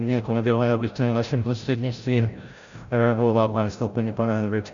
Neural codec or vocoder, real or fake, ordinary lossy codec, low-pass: codec, 16 kHz, 0.5 kbps, FreqCodec, larger model; fake; Opus, 64 kbps; 7.2 kHz